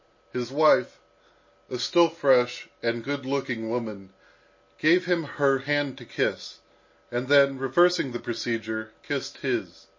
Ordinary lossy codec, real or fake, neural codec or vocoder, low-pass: MP3, 32 kbps; real; none; 7.2 kHz